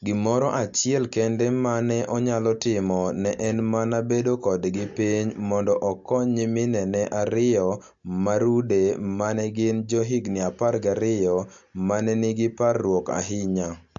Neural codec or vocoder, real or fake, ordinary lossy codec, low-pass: none; real; AAC, 64 kbps; 7.2 kHz